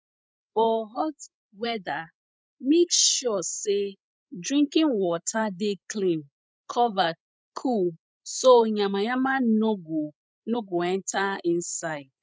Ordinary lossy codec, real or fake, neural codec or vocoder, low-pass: none; fake; codec, 16 kHz, 16 kbps, FreqCodec, larger model; none